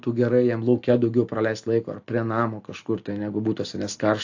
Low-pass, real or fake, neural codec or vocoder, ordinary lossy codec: 7.2 kHz; real; none; AAC, 48 kbps